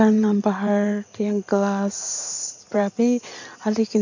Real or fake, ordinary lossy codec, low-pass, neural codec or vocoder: fake; none; 7.2 kHz; codec, 16 kHz in and 24 kHz out, 2.2 kbps, FireRedTTS-2 codec